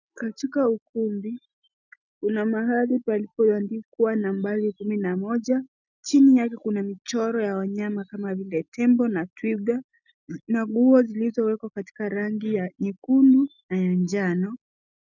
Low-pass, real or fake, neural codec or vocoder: 7.2 kHz; real; none